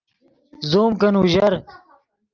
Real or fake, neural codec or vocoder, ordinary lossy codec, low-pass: real; none; Opus, 24 kbps; 7.2 kHz